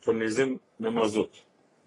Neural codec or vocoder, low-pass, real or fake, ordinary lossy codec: codec, 44.1 kHz, 3.4 kbps, Pupu-Codec; 10.8 kHz; fake; AAC, 32 kbps